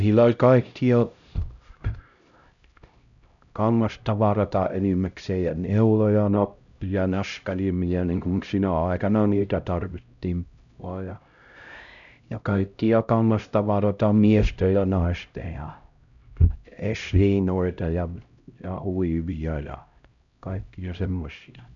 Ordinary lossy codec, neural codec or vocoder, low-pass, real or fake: none; codec, 16 kHz, 0.5 kbps, X-Codec, HuBERT features, trained on LibriSpeech; 7.2 kHz; fake